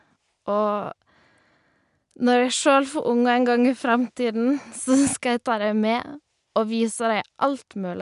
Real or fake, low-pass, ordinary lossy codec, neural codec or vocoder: real; 10.8 kHz; none; none